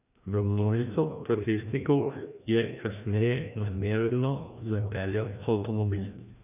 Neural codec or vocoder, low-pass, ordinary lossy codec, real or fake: codec, 16 kHz, 1 kbps, FreqCodec, larger model; 3.6 kHz; none; fake